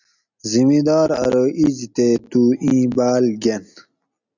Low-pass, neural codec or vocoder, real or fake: 7.2 kHz; none; real